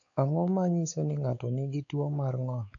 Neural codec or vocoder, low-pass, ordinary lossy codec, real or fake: codec, 16 kHz, 4 kbps, X-Codec, WavLM features, trained on Multilingual LibriSpeech; 7.2 kHz; none; fake